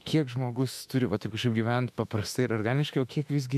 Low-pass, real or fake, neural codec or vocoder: 14.4 kHz; fake; autoencoder, 48 kHz, 32 numbers a frame, DAC-VAE, trained on Japanese speech